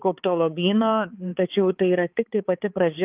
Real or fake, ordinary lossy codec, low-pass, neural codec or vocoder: fake; Opus, 24 kbps; 3.6 kHz; codec, 16 kHz, 4 kbps, X-Codec, HuBERT features, trained on balanced general audio